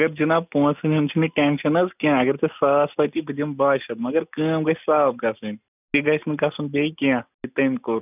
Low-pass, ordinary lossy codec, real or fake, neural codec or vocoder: 3.6 kHz; none; real; none